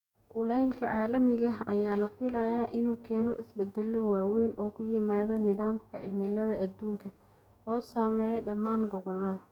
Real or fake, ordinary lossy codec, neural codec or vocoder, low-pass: fake; none; codec, 44.1 kHz, 2.6 kbps, DAC; 19.8 kHz